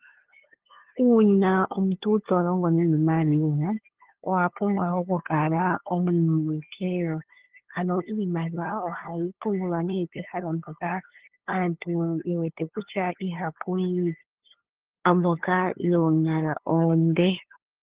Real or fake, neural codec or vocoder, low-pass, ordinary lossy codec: fake; codec, 16 kHz, 2 kbps, FunCodec, trained on LibriTTS, 25 frames a second; 3.6 kHz; Opus, 16 kbps